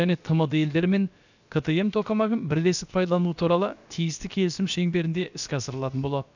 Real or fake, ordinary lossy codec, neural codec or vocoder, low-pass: fake; none; codec, 16 kHz, about 1 kbps, DyCAST, with the encoder's durations; 7.2 kHz